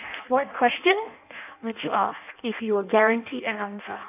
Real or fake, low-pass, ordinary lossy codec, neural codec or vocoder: fake; 3.6 kHz; none; codec, 16 kHz in and 24 kHz out, 0.6 kbps, FireRedTTS-2 codec